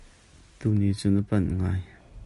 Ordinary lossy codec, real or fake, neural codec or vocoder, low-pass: MP3, 48 kbps; real; none; 14.4 kHz